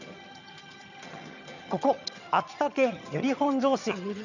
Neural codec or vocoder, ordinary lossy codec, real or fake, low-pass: vocoder, 22.05 kHz, 80 mel bands, HiFi-GAN; none; fake; 7.2 kHz